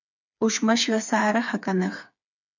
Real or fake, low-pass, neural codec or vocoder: fake; 7.2 kHz; codec, 16 kHz, 4 kbps, FreqCodec, smaller model